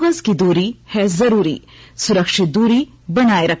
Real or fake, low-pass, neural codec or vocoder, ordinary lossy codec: real; none; none; none